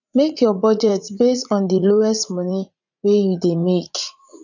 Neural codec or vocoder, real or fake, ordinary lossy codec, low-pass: vocoder, 44.1 kHz, 80 mel bands, Vocos; fake; none; 7.2 kHz